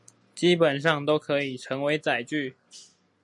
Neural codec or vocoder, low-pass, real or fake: none; 10.8 kHz; real